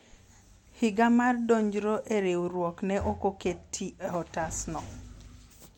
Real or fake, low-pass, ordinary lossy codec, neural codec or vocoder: real; 19.8 kHz; MP3, 64 kbps; none